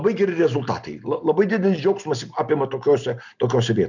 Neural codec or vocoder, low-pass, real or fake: none; 7.2 kHz; real